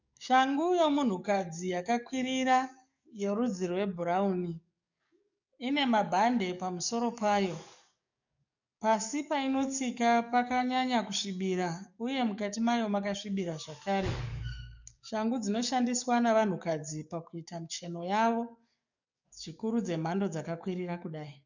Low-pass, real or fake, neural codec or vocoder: 7.2 kHz; fake; codec, 44.1 kHz, 7.8 kbps, DAC